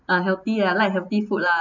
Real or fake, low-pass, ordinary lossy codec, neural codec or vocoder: real; 7.2 kHz; none; none